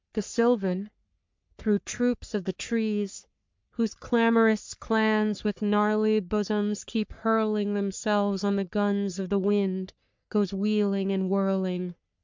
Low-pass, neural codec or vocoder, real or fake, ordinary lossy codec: 7.2 kHz; codec, 44.1 kHz, 3.4 kbps, Pupu-Codec; fake; MP3, 64 kbps